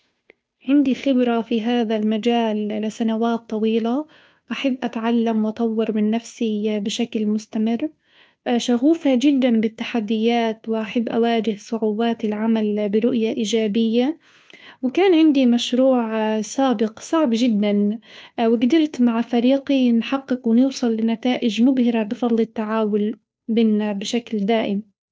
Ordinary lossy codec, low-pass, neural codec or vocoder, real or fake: none; none; codec, 16 kHz, 2 kbps, FunCodec, trained on Chinese and English, 25 frames a second; fake